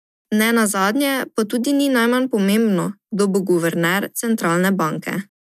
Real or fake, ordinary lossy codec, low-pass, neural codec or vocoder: real; none; 14.4 kHz; none